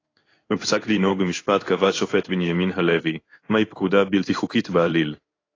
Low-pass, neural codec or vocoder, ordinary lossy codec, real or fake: 7.2 kHz; codec, 16 kHz in and 24 kHz out, 1 kbps, XY-Tokenizer; AAC, 32 kbps; fake